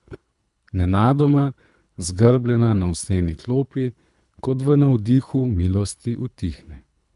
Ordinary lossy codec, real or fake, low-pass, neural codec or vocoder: none; fake; 10.8 kHz; codec, 24 kHz, 3 kbps, HILCodec